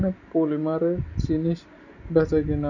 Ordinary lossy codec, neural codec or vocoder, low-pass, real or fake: none; none; 7.2 kHz; real